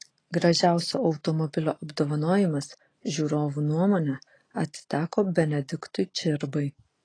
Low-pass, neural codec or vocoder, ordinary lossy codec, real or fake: 9.9 kHz; none; AAC, 32 kbps; real